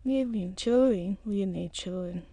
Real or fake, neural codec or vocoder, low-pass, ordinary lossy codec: fake; autoencoder, 22.05 kHz, a latent of 192 numbers a frame, VITS, trained on many speakers; 9.9 kHz; none